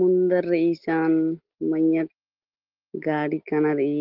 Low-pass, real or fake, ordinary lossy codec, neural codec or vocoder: 5.4 kHz; real; Opus, 16 kbps; none